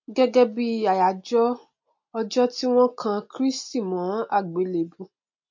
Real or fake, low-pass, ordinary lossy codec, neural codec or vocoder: real; 7.2 kHz; MP3, 48 kbps; none